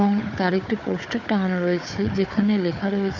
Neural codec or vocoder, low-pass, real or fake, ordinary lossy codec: codec, 16 kHz, 4 kbps, FunCodec, trained on Chinese and English, 50 frames a second; 7.2 kHz; fake; none